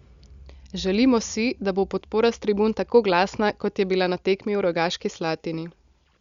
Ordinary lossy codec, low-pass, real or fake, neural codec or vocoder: Opus, 64 kbps; 7.2 kHz; real; none